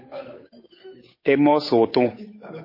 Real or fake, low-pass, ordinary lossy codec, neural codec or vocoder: fake; 5.4 kHz; MP3, 24 kbps; codec, 16 kHz, 8 kbps, FunCodec, trained on Chinese and English, 25 frames a second